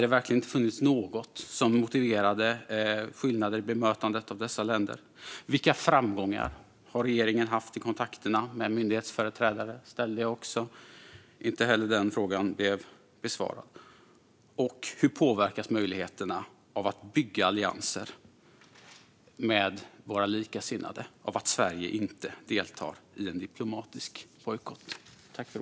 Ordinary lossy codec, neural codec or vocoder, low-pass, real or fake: none; none; none; real